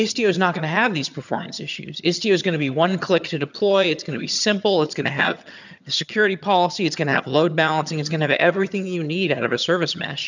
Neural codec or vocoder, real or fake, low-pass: vocoder, 22.05 kHz, 80 mel bands, HiFi-GAN; fake; 7.2 kHz